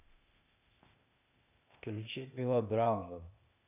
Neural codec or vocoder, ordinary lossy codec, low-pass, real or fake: codec, 16 kHz, 0.8 kbps, ZipCodec; none; 3.6 kHz; fake